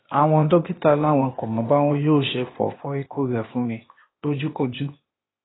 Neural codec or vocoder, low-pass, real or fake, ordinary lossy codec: codec, 16 kHz, 0.8 kbps, ZipCodec; 7.2 kHz; fake; AAC, 16 kbps